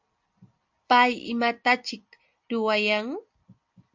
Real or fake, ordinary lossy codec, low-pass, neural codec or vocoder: real; MP3, 64 kbps; 7.2 kHz; none